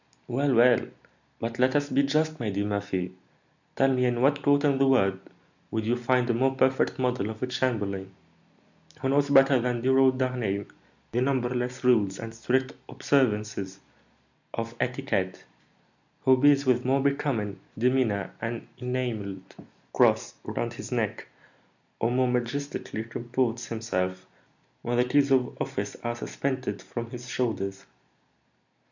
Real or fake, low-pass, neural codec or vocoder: real; 7.2 kHz; none